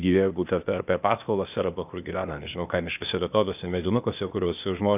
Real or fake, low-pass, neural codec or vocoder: fake; 3.6 kHz; codec, 16 kHz, 0.8 kbps, ZipCodec